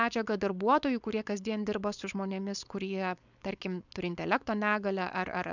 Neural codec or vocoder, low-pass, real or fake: codec, 16 kHz, 4.8 kbps, FACodec; 7.2 kHz; fake